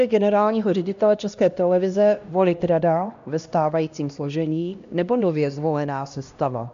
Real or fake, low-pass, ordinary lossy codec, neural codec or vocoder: fake; 7.2 kHz; MP3, 64 kbps; codec, 16 kHz, 1 kbps, X-Codec, HuBERT features, trained on LibriSpeech